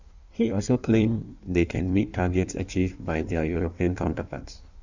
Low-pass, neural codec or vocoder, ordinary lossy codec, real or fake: 7.2 kHz; codec, 16 kHz in and 24 kHz out, 1.1 kbps, FireRedTTS-2 codec; none; fake